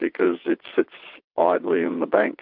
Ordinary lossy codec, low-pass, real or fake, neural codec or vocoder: MP3, 48 kbps; 5.4 kHz; fake; vocoder, 22.05 kHz, 80 mel bands, WaveNeXt